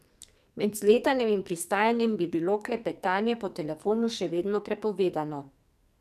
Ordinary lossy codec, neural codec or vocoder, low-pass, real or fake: none; codec, 44.1 kHz, 2.6 kbps, SNAC; 14.4 kHz; fake